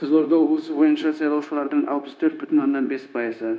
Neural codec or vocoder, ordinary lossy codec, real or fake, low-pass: codec, 16 kHz, 0.9 kbps, LongCat-Audio-Codec; none; fake; none